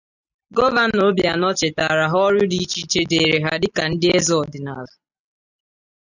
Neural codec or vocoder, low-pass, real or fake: none; 7.2 kHz; real